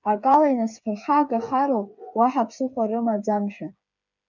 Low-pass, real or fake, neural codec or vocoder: 7.2 kHz; fake; codec, 16 kHz, 8 kbps, FreqCodec, smaller model